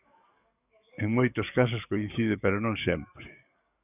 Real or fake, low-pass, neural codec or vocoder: fake; 3.6 kHz; codec, 44.1 kHz, 7.8 kbps, DAC